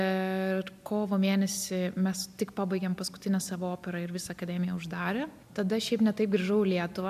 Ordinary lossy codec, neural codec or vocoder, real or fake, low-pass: AAC, 96 kbps; none; real; 14.4 kHz